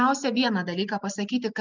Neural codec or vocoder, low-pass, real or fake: none; 7.2 kHz; real